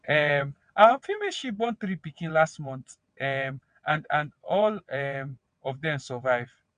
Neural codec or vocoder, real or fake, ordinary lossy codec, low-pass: vocoder, 22.05 kHz, 80 mel bands, WaveNeXt; fake; none; 9.9 kHz